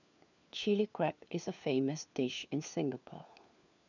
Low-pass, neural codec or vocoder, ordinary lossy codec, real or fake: 7.2 kHz; codec, 16 kHz, 4 kbps, FunCodec, trained on LibriTTS, 50 frames a second; none; fake